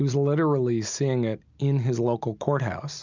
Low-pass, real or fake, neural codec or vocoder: 7.2 kHz; real; none